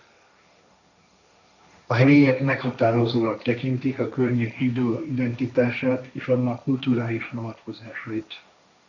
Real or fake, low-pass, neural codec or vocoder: fake; 7.2 kHz; codec, 16 kHz, 1.1 kbps, Voila-Tokenizer